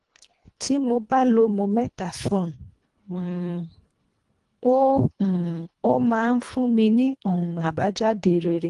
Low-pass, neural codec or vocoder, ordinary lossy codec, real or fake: 10.8 kHz; codec, 24 kHz, 1.5 kbps, HILCodec; Opus, 24 kbps; fake